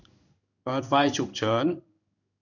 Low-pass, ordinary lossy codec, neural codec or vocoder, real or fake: 7.2 kHz; none; codec, 16 kHz in and 24 kHz out, 1 kbps, XY-Tokenizer; fake